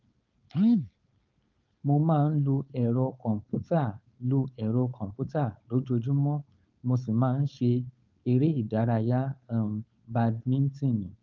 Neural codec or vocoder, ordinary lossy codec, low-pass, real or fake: codec, 16 kHz, 4.8 kbps, FACodec; Opus, 24 kbps; 7.2 kHz; fake